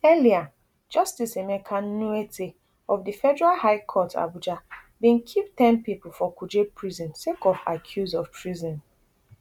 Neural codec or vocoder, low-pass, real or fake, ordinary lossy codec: none; 19.8 kHz; real; MP3, 96 kbps